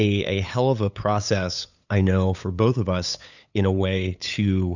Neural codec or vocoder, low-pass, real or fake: codec, 16 kHz, 8 kbps, FunCodec, trained on LibriTTS, 25 frames a second; 7.2 kHz; fake